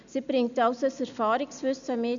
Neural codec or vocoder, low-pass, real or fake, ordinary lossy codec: none; 7.2 kHz; real; none